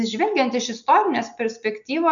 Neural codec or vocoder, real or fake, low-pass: none; real; 7.2 kHz